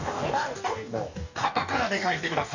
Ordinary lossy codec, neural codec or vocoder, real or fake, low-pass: none; codec, 44.1 kHz, 2.6 kbps, DAC; fake; 7.2 kHz